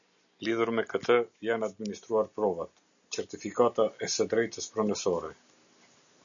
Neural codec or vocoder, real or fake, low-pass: none; real; 7.2 kHz